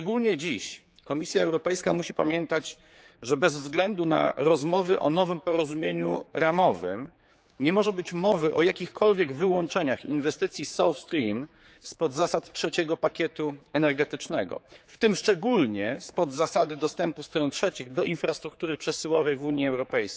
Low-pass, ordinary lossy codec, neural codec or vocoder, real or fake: none; none; codec, 16 kHz, 4 kbps, X-Codec, HuBERT features, trained on general audio; fake